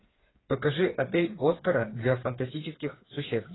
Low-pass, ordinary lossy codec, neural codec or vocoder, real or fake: 7.2 kHz; AAC, 16 kbps; codec, 16 kHz, 4 kbps, FreqCodec, smaller model; fake